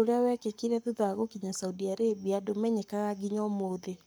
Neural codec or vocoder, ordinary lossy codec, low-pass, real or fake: codec, 44.1 kHz, 7.8 kbps, Pupu-Codec; none; none; fake